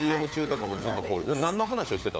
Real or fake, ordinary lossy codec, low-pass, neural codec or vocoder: fake; none; none; codec, 16 kHz, 4 kbps, FunCodec, trained on LibriTTS, 50 frames a second